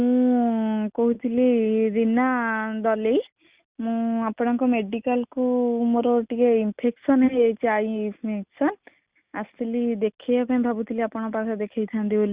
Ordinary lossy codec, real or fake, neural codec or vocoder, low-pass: Opus, 64 kbps; real; none; 3.6 kHz